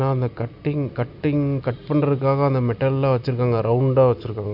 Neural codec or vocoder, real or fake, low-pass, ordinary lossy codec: none; real; 5.4 kHz; none